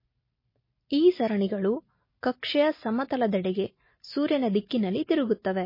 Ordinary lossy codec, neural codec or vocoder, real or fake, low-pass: MP3, 24 kbps; none; real; 5.4 kHz